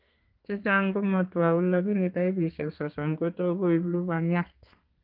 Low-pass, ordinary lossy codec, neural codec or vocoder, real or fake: 5.4 kHz; Opus, 24 kbps; codec, 32 kHz, 1.9 kbps, SNAC; fake